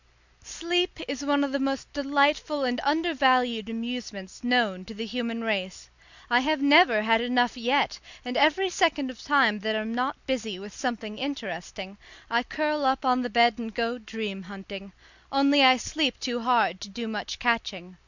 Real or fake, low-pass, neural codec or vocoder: real; 7.2 kHz; none